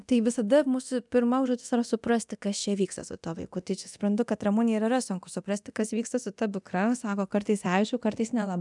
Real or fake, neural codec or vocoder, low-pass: fake; codec, 24 kHz, 0.9 kbps, DualCodec; 10.8 kHz